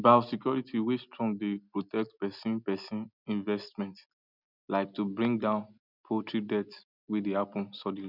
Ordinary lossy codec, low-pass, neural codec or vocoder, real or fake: none; 5.4 kHz; none; real